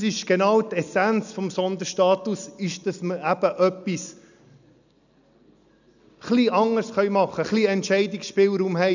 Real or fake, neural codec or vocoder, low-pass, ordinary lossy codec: real; none; 7.2 kHz; none